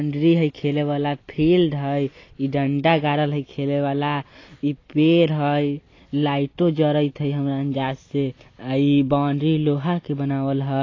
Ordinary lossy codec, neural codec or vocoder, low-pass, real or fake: AAC, 32 kbps; none; 7.2 kHz; real